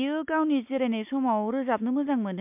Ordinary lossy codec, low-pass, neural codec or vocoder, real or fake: MP3, 32 kbps; 3.6 kHz; none; real